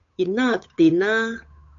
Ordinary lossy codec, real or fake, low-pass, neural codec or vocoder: AAC, 64 kbps; fake; 7.2 kHz; codec, 16 kHz, 8 kbps, FunCodec, trained on Chinese and English, 25 frames a second